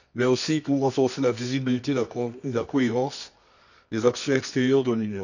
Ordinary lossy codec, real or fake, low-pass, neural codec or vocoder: none; fake; 7.2 kHz; codec, 24 kHz, 0.9 kbps, WavTokenizer, medium music audio release